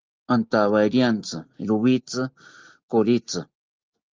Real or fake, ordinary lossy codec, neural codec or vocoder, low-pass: real; Opus, 24 kbps; none; 7.2 kHz